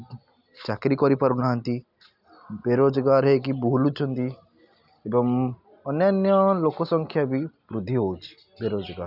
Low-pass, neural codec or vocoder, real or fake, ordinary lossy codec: 5.4 kHz; none; real; none